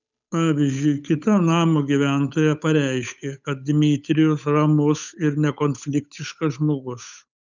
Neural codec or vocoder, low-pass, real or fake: codec, 16 kHz, 8 kbps, FunCodec, trained on Chinese and English, 25 frames a second; 7.2 kHz; fake